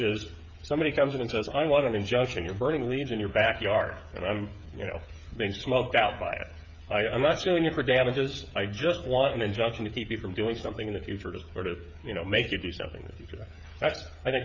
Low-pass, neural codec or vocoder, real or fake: 7.2 kHz; codec, 16 kHz, 8 kbps, FreqCodec, smaller model; fake